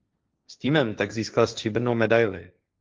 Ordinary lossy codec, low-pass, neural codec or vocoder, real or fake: Opus, 24 kbps; 7.2 kHz; codec, 16 kHz, 1.1 kbps, Voila-Tokenizer; fake